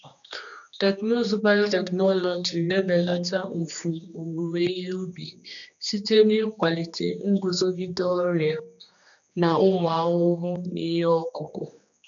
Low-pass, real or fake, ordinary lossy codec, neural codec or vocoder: 7.2 kHz; fake; none; codec, 16 kHz, 2 kbps, X-Codec, HuBERT features, trained on general audio